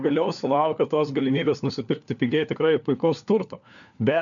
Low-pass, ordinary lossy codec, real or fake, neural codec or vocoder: 7.2 kHz; MP3, 96 kbps; fake; codec, 16 kHz, 4 kbps, FunCodec, trained on LibriTTS, 50 frames a second